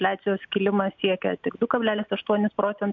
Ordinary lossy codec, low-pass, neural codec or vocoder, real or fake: MP3, 64 kbps; 7.2 kHz; none; real